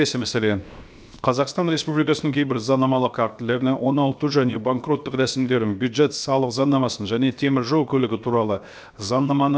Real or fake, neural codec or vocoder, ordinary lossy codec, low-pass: fake; codec, 16 kHz, 0.7 kbps, FocalCodec; none; none